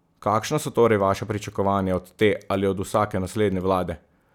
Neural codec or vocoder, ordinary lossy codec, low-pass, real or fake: none; none; 19.8 kHz; real